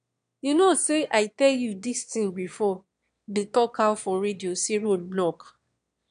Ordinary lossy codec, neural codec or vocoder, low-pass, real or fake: none; autoencoder, 22.05 kHz, a latent of 192 numbers a frame, VITS, trained on one speaker; 9.9 kHz; fake